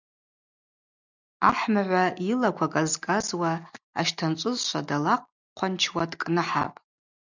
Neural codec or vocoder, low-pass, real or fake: none; 7.2 kHz; real